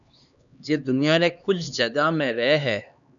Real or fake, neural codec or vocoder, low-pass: fake; codec, 16 kHz, 2 kbps, X-Codec, HuBERT features, trained on LibriSpeech; 7.2 kHz